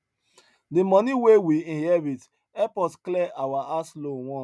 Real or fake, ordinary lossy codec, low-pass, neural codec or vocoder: real; none; none; none